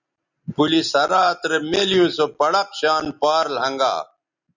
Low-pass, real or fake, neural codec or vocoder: 7.2 kHz; real; none